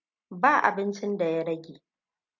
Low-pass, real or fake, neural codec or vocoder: 7.2 kHz; real; none